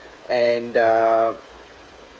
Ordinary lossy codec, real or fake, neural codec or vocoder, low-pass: none; fake; codec, 16 kHz, 8 kbps, FreqCodec, smaller model; none